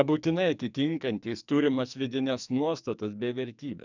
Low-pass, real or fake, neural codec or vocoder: 7.2 kHz; fake; codec, 44.1 kHz, 2.6 kbps, SNAC